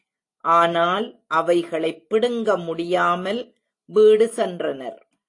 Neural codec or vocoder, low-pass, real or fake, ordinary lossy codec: none; 10.8 kHz; real; AAC, 48 kbps